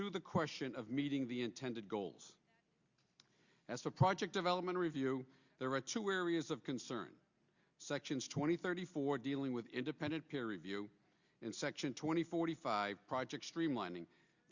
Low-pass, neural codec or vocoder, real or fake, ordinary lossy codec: 7.2 kHz; none; real; Opus, 64 kbps